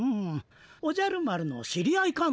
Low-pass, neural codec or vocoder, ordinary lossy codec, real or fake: none; none; none; real